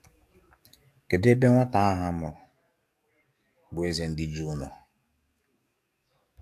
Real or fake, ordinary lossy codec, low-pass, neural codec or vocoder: fake; AAC, 64 kbps; 14.4 kHz; codec, 44.1 kHz, 7.8 kbps, DAC